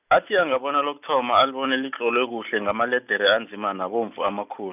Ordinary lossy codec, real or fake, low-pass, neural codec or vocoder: none; real; 3.6 kHz; none